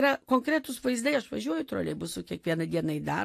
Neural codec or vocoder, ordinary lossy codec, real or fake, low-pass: none; AAC, 64 kbps; real; 14.4 kHz